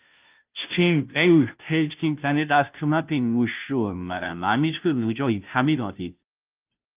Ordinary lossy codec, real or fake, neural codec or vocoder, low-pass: Opus, 24 kbps; fake; codec, 16 kHz, 0.5 kbps, FunCodec, trained on Chinese and English, 25 frames a second; 3.6 kHz